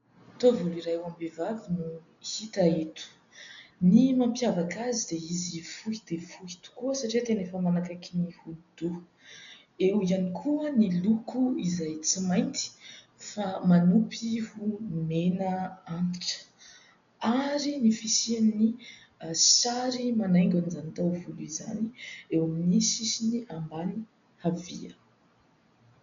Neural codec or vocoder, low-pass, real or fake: none; 7.2 kHz; real